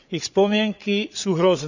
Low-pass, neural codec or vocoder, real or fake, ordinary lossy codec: 7.2 kHz; codec, 16 kHz, 8 kbps, FreqCodec, larger model; fake; none